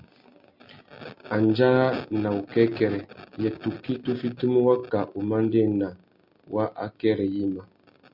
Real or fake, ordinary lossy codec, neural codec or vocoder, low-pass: real; MP3, 48 kbps; none; 5.4 kHz